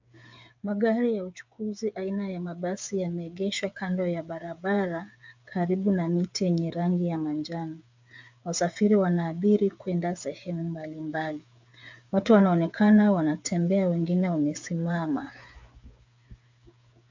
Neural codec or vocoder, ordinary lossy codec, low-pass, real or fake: codec, 16 kHz, 8 kbps, FreqCodec, smaller model; MP3, 64 kbps; 7.2 kHz; fake